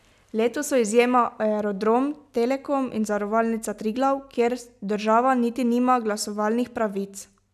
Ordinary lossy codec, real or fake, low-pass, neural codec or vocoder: none; real; 14.4 kHz; none